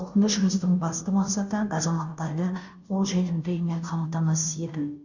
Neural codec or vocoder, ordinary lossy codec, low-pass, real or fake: codec, 16 kHz, 0.5 kbps, FunCodec, trained on Chinese and English, 25 frames a second; none; 7.2 kHz; fake